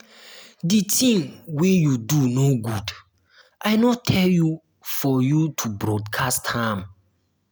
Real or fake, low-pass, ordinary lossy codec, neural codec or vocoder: real; none; none; none